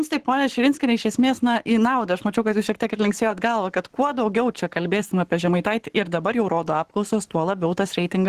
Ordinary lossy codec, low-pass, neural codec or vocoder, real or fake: Opus, 16 kbps; 14.4 kHz; codec, 44.1 kHz, 7.8 kbps, Pupu-Codec; fake